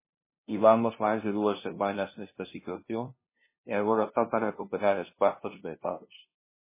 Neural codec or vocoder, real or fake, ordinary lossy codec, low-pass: codec, 16 kHz, 0.5 kbps, FunCodec, trained on LibriTTS, 25 frames a second; fake; MP3, 16 kbps; 3.6 kHz